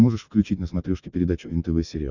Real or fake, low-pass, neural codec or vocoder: real; 7.2 kHz; none